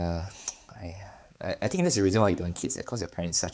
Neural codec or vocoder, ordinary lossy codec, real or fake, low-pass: codec, 16 kHz, 4 kbps, X-Codec, HuBERT features, trained on balanced general audio; none; fake; none